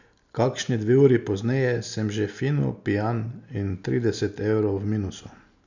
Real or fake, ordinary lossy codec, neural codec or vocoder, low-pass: real; none; none; 7.2 kHz